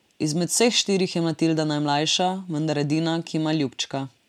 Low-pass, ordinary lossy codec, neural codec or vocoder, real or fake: 19.8 kHz; MP3, 96 kbps; none; real